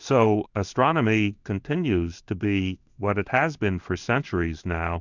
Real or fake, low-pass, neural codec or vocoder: fake; 7.2 kHz; codec, 16 kHz in and 24 kHz out, 1 kbps, XY-Tokenizer